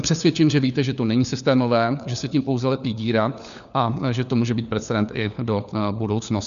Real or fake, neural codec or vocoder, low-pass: fake; codec, 16 kHz, 4 kbps, FunCodec, trained on LibriTTS, 50 frames a second; 7.2 kHz